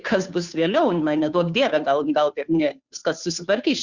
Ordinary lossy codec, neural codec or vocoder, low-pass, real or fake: Opus, 64 kbps; codec, 16 kHz, 2 kbps, FunCodec, trained on Chinese and English, 25 frames a second; 7.2 kHz; fake